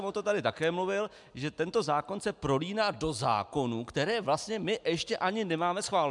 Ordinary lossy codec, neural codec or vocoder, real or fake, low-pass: MP3, 96 kbps; none; real; 10.8 kHz